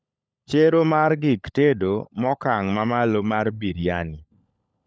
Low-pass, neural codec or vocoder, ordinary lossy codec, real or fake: none; codec, 16 kHz, 16 kbps, FunCodec, trained on LibriTTS, 50 frames a second; none; fake